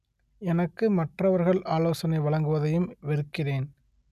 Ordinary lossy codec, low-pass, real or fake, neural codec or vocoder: none; 14.4 kHz; real; none